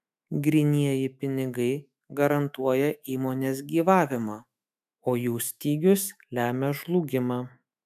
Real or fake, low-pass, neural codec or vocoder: fake; 14.4 kHz; autoencoder, 48 kHz, 128 numbers a frame, DAC-VAE, trained on Japanese speech